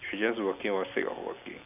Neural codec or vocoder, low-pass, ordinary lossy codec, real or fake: none; 3.6 kHz; none; real